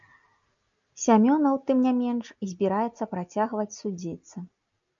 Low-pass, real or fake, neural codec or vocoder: 7.2 kHz; real; none